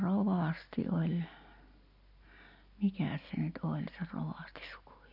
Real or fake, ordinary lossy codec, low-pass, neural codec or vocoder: real; none; 5.4 kHz; none